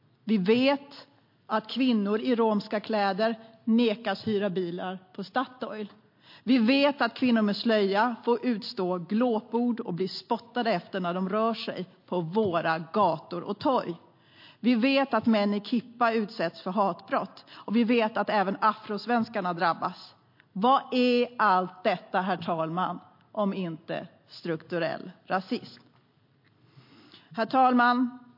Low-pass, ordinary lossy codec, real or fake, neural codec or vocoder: 5.4 kHz; MP3, 32 kbps; real; none